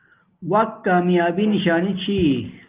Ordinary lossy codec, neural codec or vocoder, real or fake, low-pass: Opus, 32 kbps; none; real; 3.6 kHz